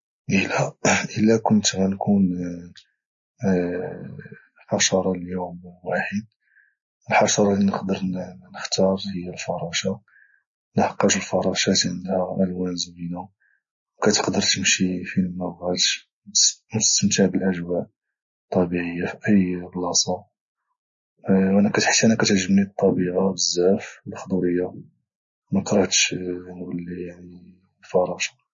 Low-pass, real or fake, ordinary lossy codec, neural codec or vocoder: 7.2 kHz; real; MP3, 32 kbps; none